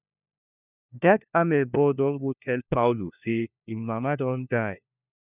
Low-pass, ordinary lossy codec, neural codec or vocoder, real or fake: 3.6 kHz; none; codec, 16 kHz, 1 kbps, FunCodec, trained on LibriTTS, 50 frames a second; fake